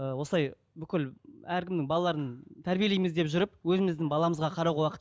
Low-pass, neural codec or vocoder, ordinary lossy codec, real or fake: none; none; none; real